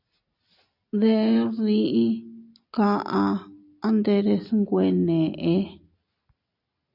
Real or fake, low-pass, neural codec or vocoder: real; 5.4 kHz; none